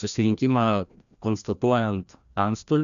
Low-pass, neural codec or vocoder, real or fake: 7.2 kHz; codec, 16 kHz, 1 kbps, FreqCodec, larger model; fake